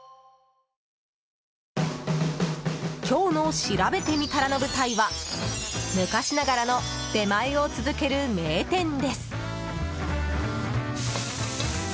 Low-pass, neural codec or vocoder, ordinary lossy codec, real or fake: none; none; none; real